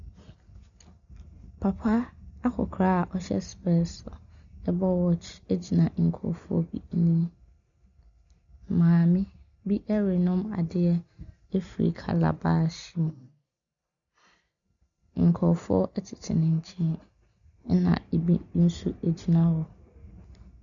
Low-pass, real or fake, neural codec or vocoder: 7.2 kHz; real; none